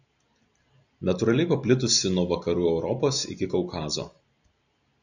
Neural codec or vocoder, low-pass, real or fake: none; 7.2 kHz; real